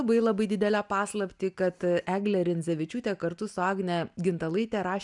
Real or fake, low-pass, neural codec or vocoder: real; 10.8 kHz; none